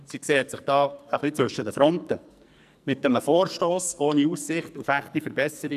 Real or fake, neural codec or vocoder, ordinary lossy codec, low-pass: fake; codec, 44.1 kHz, 2.6 kbps, SNAC; none; 14.4 kHz